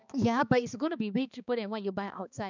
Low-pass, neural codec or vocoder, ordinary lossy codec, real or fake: 7.2 kHz; codec, 16 kHz, 2 kbps, X-Codec, HuBERT features, trained on balanced general audio; none; fake